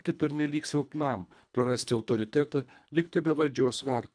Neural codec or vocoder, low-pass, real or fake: codec, 24 kHz, 1.5 kbps, HILCodec; 9.9 kHz; fake